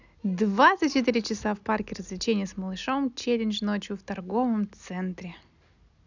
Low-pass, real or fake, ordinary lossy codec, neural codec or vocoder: 7.2 kHz; real; none; none